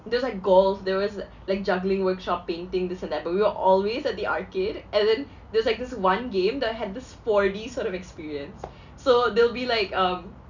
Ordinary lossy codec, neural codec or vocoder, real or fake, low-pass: none; none; real; 7.2 kHz